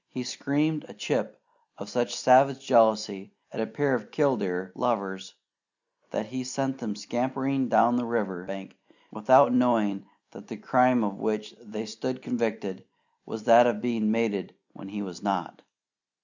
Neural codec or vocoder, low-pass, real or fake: none; 7.2 kHz; real